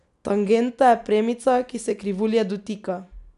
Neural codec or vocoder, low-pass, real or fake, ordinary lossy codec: none; 10.8 kHz; real; none